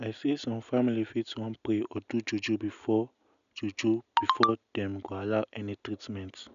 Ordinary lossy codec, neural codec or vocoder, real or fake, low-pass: none; none; real; 7.2 kHz